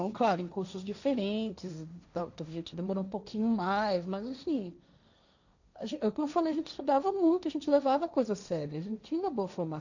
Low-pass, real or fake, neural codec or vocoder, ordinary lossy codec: 7.2 kHz; fake; codec, 16 kHz, 1.1 kbps, Voila-Tokenizer; none